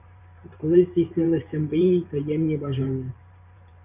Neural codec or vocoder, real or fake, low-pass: vocoder, 44.1 kHz, 128 mel bands every 512 samples, BigVGAN v2; fake; 3.6 kHz